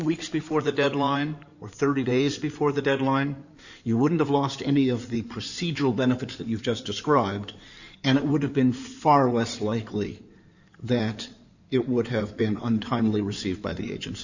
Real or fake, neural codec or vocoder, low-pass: fake; codec, 16 kHz in and 24 kHz out, 2.2 kbps, FireRedTTS-2 codec; 7.2 kHz